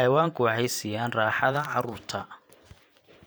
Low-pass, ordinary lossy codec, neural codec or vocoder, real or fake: none; none; vocoder, 44.1 kHz, 128 mel bands every 512 samples, BigVGAN v2; fake